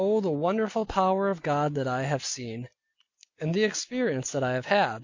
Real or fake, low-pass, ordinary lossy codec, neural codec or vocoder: real; 7.2 kHz; MP3, 48 kbps; none